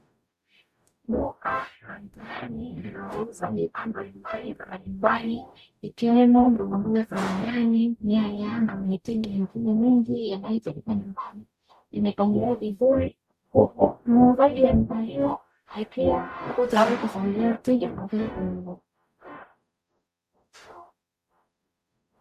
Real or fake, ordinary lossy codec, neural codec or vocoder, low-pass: fake; Opus, 64 kbps; codec, 44.1 kHz, 0.9 kbps, DAC; 14.4 kHz